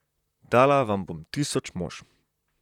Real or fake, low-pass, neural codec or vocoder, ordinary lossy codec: fake; 19.8 kHz; vocoder, 44.1 kHz, 128 mel bands, Pupu-Vocoder; none